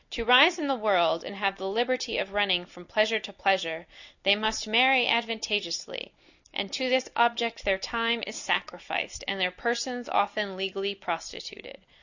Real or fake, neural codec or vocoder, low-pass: real; none; 7.2 kHz